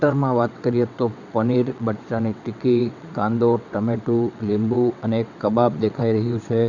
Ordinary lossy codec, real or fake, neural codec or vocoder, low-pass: none; fake; vocoder, 22.05 kHz, 80 mel bands, WaveNeXt; 7.2 kHz